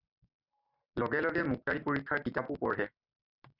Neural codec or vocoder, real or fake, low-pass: none; real; 5.4 kHz